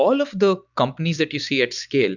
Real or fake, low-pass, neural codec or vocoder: real; 7.2 kHz; none